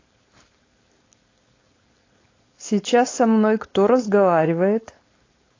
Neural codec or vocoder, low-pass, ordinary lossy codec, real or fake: codec, 16 kHz, 4.8 kbps, FACodec; 7.2 kHz; AAC, 32 kbps; fake